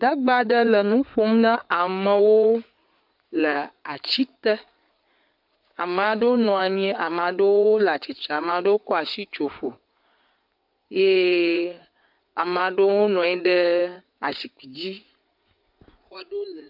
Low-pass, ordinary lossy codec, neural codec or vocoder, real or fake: 5.4 kHz; MP3, 48 kbps; codec, 16 kHz in and 24 kHz out, 2.2 kbps, FireRedTTS-2 codec; fake